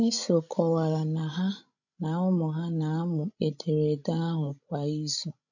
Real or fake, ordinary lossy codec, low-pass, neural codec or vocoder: fake; none; 7.2 kHz; codec, 16 kHz, 16 kbps, FreqCodec, larger model